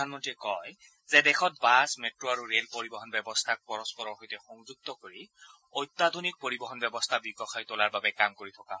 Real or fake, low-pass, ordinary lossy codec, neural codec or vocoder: real; none; none; none